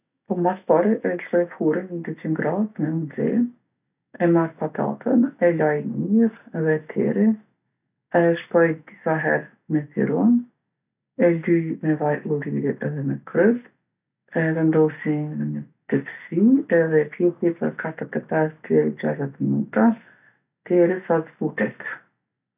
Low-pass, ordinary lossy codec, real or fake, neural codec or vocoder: 3.6 kHz; none; real; none